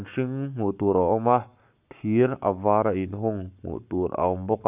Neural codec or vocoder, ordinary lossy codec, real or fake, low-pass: codec, 44.1 kHz, 7.8 kbps, Pupu-Codec; none; fake; 3.6 kHz